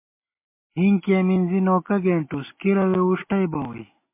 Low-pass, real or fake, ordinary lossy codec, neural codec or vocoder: 3.6 kHz; real; MP3, 24 kbps; none